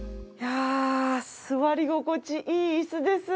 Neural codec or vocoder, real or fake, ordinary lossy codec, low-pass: none; real; none; none